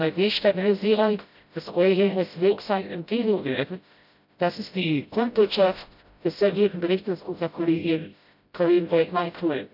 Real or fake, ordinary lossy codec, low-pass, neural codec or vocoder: fake; none; 5.4 kHz; codec, 16 kHz, 0.5 kbps, FreqCodec, smaller model